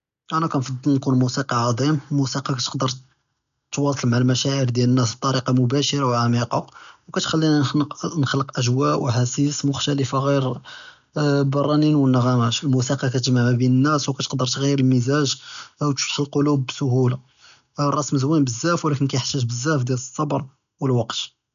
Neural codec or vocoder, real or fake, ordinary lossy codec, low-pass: none; real; none; 7.2 kHz